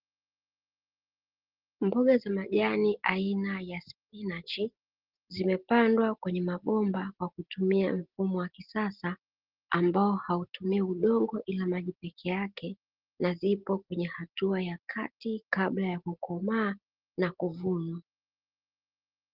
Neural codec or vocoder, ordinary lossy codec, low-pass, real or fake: none; Opus, 16 kbps; 5.4 kHz; real